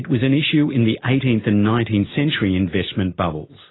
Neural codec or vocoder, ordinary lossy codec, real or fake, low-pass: none; AAC, 16 kbps; real; 7.2 kHz